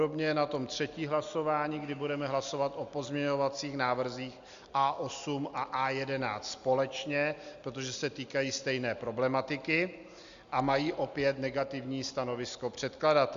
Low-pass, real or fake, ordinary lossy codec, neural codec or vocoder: 7.2 kHz; real; Opus, 64 kbps; none